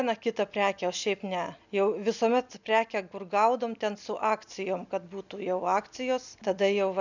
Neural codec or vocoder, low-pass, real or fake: none; 7.2 kHz; real